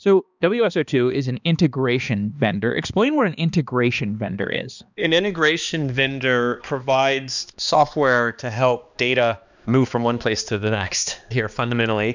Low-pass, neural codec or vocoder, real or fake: 7.2 kHz; codec, 16 kHz, 2 kbps, X-Codec, HuBERT features, trained on balanced general audio; fake